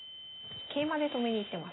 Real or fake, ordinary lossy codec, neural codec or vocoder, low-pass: real; AAC, 16 kbps; none; 7.2 kHz